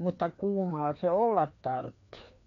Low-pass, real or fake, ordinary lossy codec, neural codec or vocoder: 7.2 kHz; fake; MP3, 64 kbps; codec, 16 kHz, 2 kbps, FreqCodec, larger model